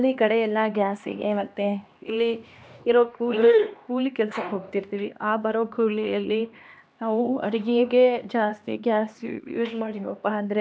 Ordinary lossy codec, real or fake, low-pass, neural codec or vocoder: none; fake; none; codec, 16 kHz, 2 kbps, X-Codec, HuBERT features, trained on LibriSpeech